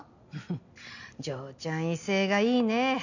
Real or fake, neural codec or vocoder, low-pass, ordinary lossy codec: real; none; 7.2 kHz; none